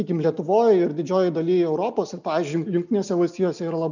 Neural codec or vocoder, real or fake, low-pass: none; real; 7.2 kHz